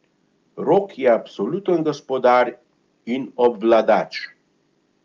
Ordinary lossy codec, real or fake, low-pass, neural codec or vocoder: Opus, 24 kbps; real; 7.2 kHz; none